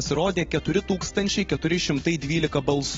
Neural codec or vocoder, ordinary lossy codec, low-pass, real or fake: none; AAC, 24 kbps; 7.2 kHz; real